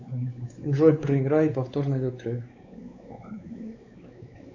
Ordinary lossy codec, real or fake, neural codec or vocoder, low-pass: AAC, 48 kbps; fake; codec, 16 kHz, 4 kbps, X-Codec, WavLM features, trained on Multilingual LibriSpeech; 7.2 kHz